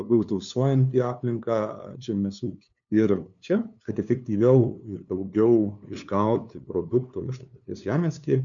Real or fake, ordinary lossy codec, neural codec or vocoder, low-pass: fake; MP3, 96 kbps; codec, 16 kHz, 2 kbps, FunCodec, trained on LibriTTS, 25 frames a second; 7.2 kHz